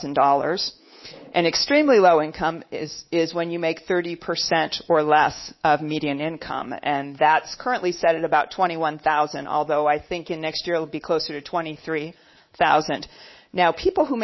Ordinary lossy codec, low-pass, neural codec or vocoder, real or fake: MP3, 24 kbps; 7.2 kHz; codec, 24 kHz, 3.1 kbps, DualCodec; fake